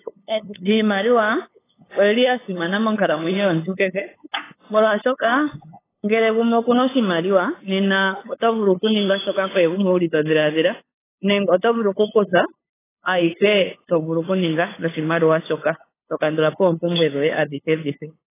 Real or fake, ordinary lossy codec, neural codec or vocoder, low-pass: fake; AAC, 16 kbps; codec, 16 kHz, 8 kbps, FunCodec, trained on LibriTTS, 25 frames a second; 3.6 kHz